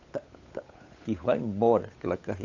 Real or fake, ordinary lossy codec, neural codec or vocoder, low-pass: fake; AAC, 48 kbps; codec, 16 kHz, 8 kbps, FunCodec, trained on Chinese and English, 25 frames a second; 7.2 kHz